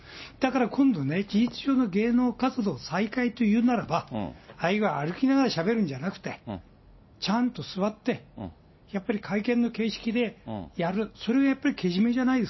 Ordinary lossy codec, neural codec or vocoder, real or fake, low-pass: MP3, 24 kbps; none; real; 7.2 kHz